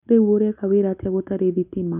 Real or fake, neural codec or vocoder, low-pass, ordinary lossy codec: real; none; 3.6 kHz; none